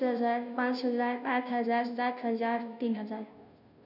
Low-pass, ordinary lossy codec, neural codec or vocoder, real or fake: 5.4 kHz; none; codec, 16 kHz, 0.5 kbps, FunCodec, trained on Chinese and English, 25 frames a second; fake